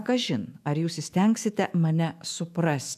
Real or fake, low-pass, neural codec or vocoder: fake; 14.4 kHz; autoencoder, 48 kHz, 128 numbers a frame, DAC-VAE, trained on Japanese speech